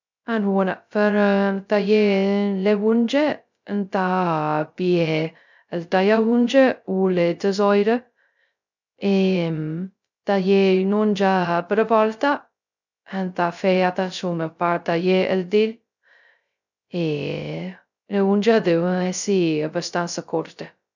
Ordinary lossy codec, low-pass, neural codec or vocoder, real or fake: none; 7.2 kHz; codec, 16 kHz, 0.2 kbps, FocalCodec; fake